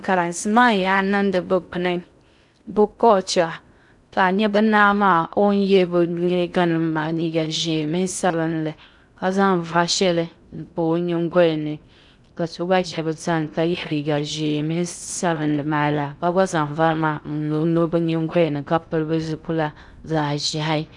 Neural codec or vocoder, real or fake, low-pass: codec, 16 kHz in and 24 kHz out, 0.6 kbps, FocalCodec, streaming, 2048 codes; fake; 10.8 kHz